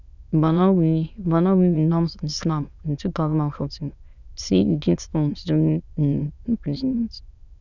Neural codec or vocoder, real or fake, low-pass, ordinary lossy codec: autoencoder, 22.05 kHz, a latent of 192 numbers a frame, VITS, trained on many speakers; fake; 7.2 kHz; none